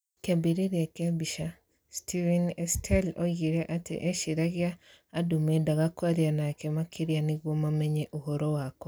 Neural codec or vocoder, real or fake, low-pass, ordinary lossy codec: none; real; none; none